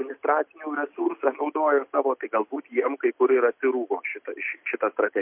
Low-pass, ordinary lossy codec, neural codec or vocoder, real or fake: 3.6 kHz; MP3, 32 kbps; none; real